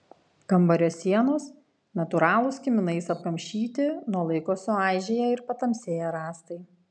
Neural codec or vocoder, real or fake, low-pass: none; real; 9.9 kHz